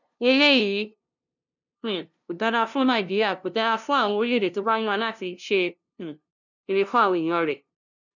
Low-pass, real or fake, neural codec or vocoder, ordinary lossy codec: 7.2 kHz; fake; codec, 16 kHz, 0.5 kbps, FunCodec, trained on LibriTTS, 25 frames a second; none